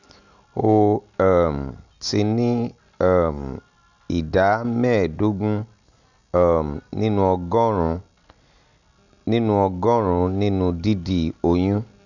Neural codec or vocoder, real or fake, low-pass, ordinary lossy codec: none; real; 7.2 kHz; none